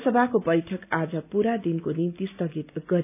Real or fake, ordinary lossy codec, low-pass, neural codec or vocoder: real; none; 3.6 kHz; none